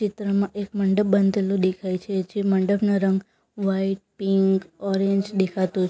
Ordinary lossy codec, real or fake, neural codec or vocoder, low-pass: none; real; none; none